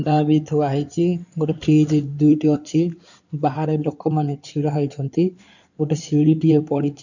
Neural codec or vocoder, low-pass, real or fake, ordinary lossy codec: codec, 16 kHz in and 24 kHz out, 2.2 kbps, FireRedTTS-2 codec; 7.2 kHz; fake; none